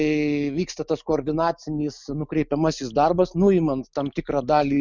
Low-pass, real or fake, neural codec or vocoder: 7.2 kHz; real; none